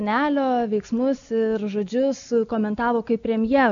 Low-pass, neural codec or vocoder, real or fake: 7.2 kHz; none; real